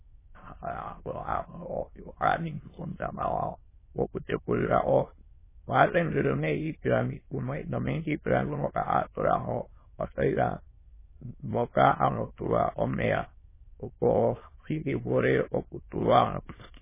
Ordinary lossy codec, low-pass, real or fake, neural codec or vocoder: MP3, 16 kbps; 3.6 kHz; fake; autoencoder, 22.05 kHz, a latent of 192 numbers a frame, VITS, trained on many speakers